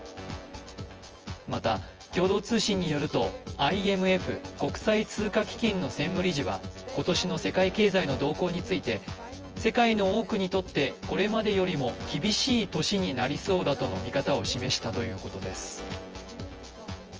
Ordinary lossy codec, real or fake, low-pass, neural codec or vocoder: Opus, 24 kbps; fake; 7.2 kHz; vocoder, 24 kHz, 100 mel bands, Vocos